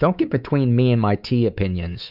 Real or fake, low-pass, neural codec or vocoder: fake; 5.4 kHz; codec, 24 kHz, 3.1 kbps, DualCodec